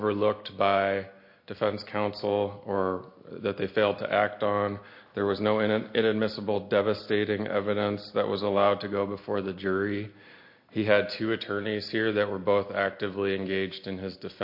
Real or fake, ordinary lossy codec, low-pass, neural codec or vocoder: real; MP3, 32 kbps; 5.4 kHz; none